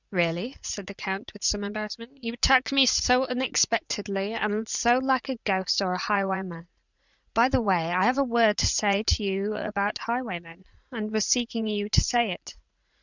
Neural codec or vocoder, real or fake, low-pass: vocoder, 44.1 kHz, 80 mel bands, Vocos; fake; 7.2 kHz